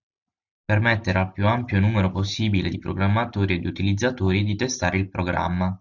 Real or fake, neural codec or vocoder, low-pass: real; none; 7.2 kHz